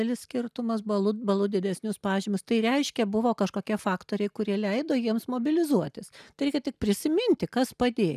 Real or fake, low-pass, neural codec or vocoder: real; 14.4 kHz; none